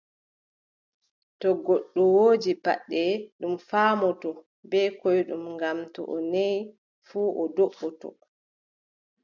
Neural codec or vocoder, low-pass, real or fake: none; 7.2 kHz; real